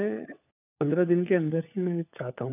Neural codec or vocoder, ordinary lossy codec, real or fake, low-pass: codec, 16 kHz, 4 kbps, FunCodec, trained on LibriTTS, 50 frames a second; none; fake; 3.6 kHz